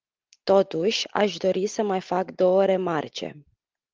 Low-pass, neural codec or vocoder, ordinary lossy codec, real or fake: 7.2 kHz; none; Opus, 32 kbps; real